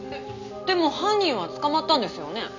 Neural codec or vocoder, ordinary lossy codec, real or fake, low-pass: none; none; real; 7.2 kHz